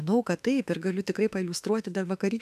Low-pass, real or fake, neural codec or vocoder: 14.4 kHz; fake; autoencoder, 48 kHz, 32 numbers a frame, DAC-VAE, trained on Japanese speech